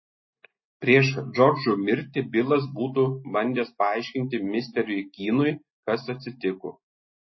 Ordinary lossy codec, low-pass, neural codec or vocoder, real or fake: MP3, 24 kbps; 7.2 kHz; none; real